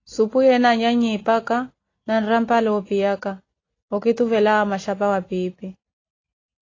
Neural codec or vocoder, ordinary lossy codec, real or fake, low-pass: none; AAC, 32 kbps; real; 7.2 kHz